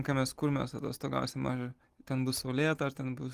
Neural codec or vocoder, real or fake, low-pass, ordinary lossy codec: none; real; 14.4 kHz; Opus, 24 kbps